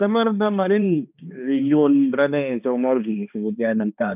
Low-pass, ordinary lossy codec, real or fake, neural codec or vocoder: 3.6 kHz; none; fake; codec, 16 kHz, 2 kbps, X-Codec, HuBERT features, trained on balanced general audio